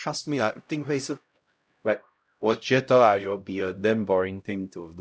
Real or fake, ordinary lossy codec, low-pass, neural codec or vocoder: fake; none; none; codec, 16 kHz, 0.5 kbps, X-Codec, HuBERT features, trained on LibriSpeech